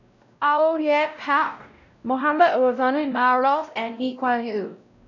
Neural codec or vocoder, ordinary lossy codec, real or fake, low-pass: codec, 16 kHz, 0.5 kbps, X-Codec, WavLM features, trained on Multilingual LibriSpeech; none; fake; 7.2 kHz